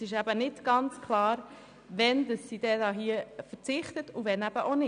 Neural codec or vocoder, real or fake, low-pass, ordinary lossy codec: none; real; 9.9 kHz; none